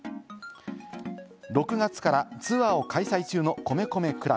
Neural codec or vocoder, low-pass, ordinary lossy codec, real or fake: none; none; none; real